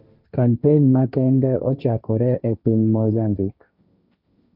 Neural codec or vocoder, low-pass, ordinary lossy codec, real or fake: codec, 16 kHz, 1.1 kbps, Voila-Tokenizer; 5.4 kHz; none; fake